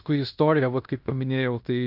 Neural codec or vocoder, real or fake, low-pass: codec, 16 kHz in and 24 kHz out, 0.9 kbps, LongCat-Audio-Codec, fine tuned four codebook decoder; fake; 5.4 kHz